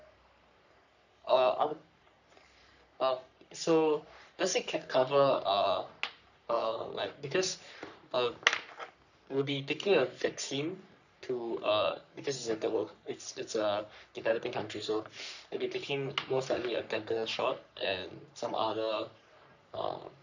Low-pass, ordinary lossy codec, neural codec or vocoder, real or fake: 7.2 kHz; none; codec, 44.1 kHz, 3.4 kbps, Pupu-Codec; fake